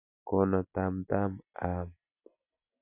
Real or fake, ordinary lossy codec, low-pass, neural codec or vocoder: real; none; 3.6 kHz; none